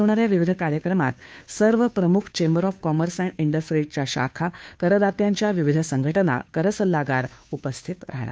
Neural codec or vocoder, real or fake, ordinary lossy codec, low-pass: codec, 16 kHz, 2 kbps, FunCodec, trained on Chinese and English, 25 frames a second; fake; none; none